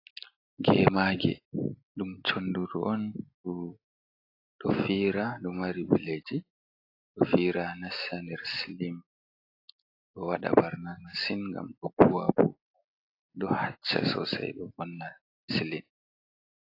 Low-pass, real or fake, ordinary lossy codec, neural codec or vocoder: 5.4 kHz; real; AAC, 32 kbps; none